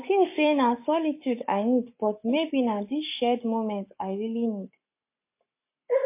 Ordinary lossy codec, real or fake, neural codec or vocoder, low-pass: MP3, 24 kbps; fake; codec, 44.1 kHz, 7.8 kbps, Pupu-Codec; 3.6 kHz